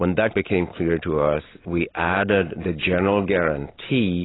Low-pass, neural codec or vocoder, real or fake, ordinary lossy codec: 7.2 kHz; none; real; AAC, 16 kbps